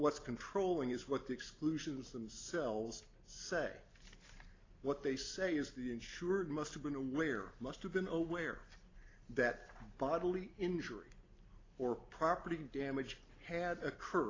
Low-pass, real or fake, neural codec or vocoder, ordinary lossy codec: 7.2 kHz; real; none; AAC, 32 kbps